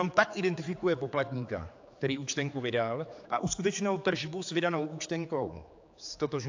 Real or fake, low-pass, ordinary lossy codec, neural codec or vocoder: fake; 7.2 kHz; AAC, 48 kbps; codec, 16 kHz, 4 kbps, X-Codec, HuBERT features, trained on general audio